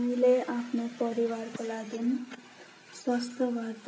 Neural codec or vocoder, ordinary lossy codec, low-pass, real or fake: none; none; none; real